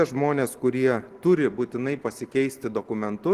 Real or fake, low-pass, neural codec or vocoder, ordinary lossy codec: real; 14.4 kHz; none; Opus, 16 kbps